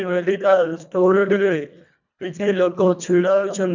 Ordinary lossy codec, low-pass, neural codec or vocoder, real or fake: none; 7.2 kHz; codec, 24 kHz, 1.5 kbps, HILCodec; fake